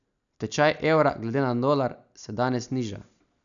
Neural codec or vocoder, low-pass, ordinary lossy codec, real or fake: none; 7.2 kHz; none; real